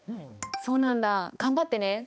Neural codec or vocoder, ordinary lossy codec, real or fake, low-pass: codec, 16 kHz, 2 kbps, X-Codec, HuBERT features, trained on balanced general audio; none; fake; none